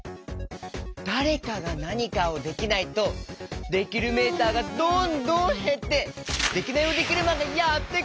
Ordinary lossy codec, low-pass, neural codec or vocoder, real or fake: none; none; none; real